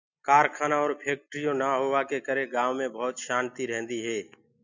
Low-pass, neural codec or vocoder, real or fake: 7.2 kHz; none; real